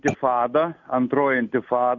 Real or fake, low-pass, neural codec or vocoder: real; 7.2 kHz; none